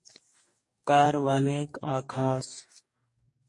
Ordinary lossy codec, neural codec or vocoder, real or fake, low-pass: MP3, 48 kbps; codec, 44.1 kHz, 2.6 kbps, DAC; fake; 10.8 kHz